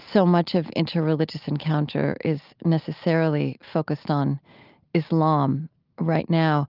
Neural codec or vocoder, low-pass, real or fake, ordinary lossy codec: none; 5.4 kHz; real; Opus, 24 kbps